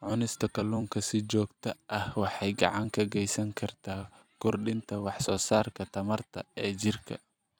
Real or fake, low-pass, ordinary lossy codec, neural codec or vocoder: fake; none; none; vocoder, 44.1 kHz, 128 mel bands every 256 samples, BigVGAN v2